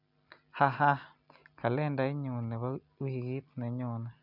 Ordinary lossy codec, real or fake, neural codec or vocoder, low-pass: none; real; none; 5.4 kHz